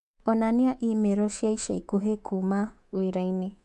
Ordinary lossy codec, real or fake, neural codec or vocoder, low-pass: AAC, 64 kbps; fake; codec, 24 kHz, 3.1 kbps, DualCodec; 10.8 kHz